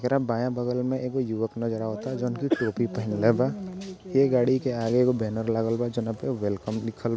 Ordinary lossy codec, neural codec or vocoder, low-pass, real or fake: none; none; none; real